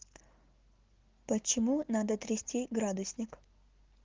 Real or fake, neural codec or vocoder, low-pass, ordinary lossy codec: real; none; 7.2 kHz; Opus, 16 kbps